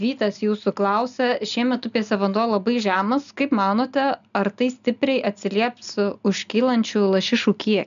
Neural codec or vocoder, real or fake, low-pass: none; real; 7.2 kHz